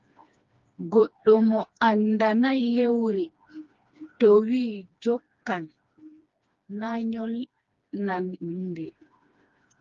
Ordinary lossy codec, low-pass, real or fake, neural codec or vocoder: Opus, 32 kbps; 7.2 kHz; fake; codec, 16 kHz, 2 kbps, FreqCodec, smaller model